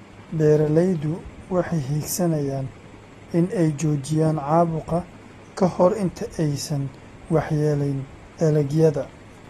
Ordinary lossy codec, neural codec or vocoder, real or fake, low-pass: AAC, 32 kbps; none; real; 19.8 kHz